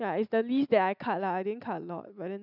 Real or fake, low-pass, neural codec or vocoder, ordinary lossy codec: real; 5.4 kHz; none; none